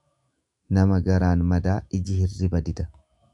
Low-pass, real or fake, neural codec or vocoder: 10.8 kHz; fake; autoencoder, 48 kHz, 128 numbers a frame, DAC-VAE, trained on Japanese speech